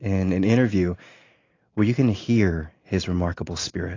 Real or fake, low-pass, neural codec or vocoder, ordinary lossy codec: real; 7.2 kHz; none; AAC, 32 kbps